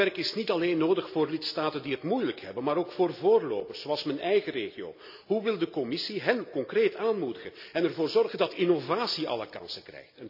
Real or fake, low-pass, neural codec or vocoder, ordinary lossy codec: real; 5.4 kHz; none; none